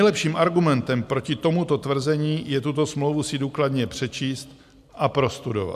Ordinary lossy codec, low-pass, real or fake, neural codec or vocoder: AAC, 96 kbps; 14.4 kHz; real; none